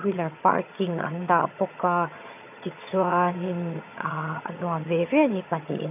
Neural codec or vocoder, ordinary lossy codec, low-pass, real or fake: vocoder, 22.05 kHz, 80 mel bands, HiFi-GAN; none; 3.6 kHz; fake